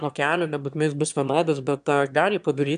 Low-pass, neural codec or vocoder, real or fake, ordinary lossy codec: 9.9 kHz; autoencoder, 22.05 kHz, a latent of 192 numbers a frame, VITS, trained on one speaker; fake; AAC, 96 kbps